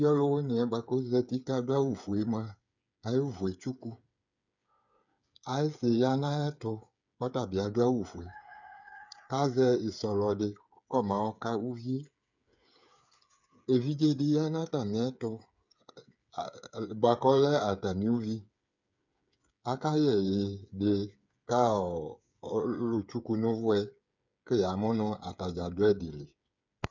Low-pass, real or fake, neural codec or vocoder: 7.2 kHz; fake; codec, 16 kHz, 8 kbps, FreqCodec, smaller model